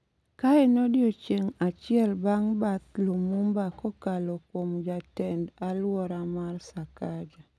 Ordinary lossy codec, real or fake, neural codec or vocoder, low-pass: none; real; none; none